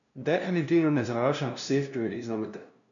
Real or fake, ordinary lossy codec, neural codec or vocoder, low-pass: fake; none; codec, 16 kHz, 0.5 kbps, FunCodec, trained on LibriTTS, 25 frames a second; 7.2 kHz